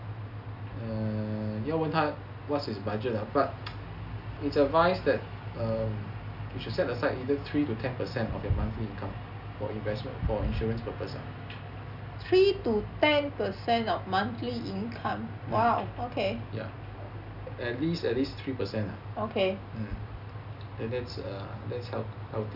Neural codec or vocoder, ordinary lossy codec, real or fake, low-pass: none; none; real; 5.4 kHz